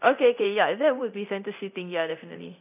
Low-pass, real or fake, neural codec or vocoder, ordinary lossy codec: 3.6 kHz; fake; codec, 24 kHz, 0.9 kbps, DualCodec; none